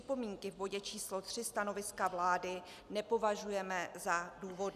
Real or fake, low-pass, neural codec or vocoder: real; 14.4 kHz; none